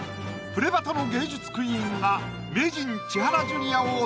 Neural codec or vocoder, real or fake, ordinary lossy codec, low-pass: none; real; none; none